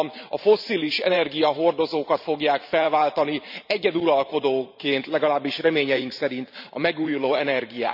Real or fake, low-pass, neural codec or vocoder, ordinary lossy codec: fake; 5.4 kHz; vocoder, 44.1 kHz, 128 mel bands every 512 samples, BigVGAN v2; none